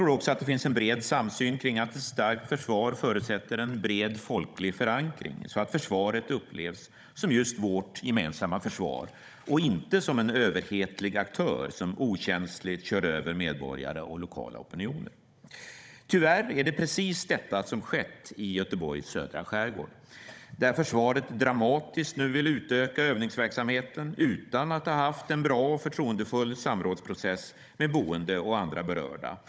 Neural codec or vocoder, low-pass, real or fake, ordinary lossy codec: codec, 16 kHz, 16 kbps, FunCodec, trained on Chinese and English, 50 frames a second; none; fake; none